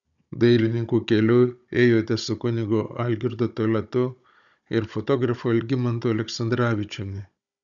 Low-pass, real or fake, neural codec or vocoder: 7.2 kHz; fake; codec, 16 kHz, 16 kbps, FunCodec, trained on Chinese and English, 50 frames a second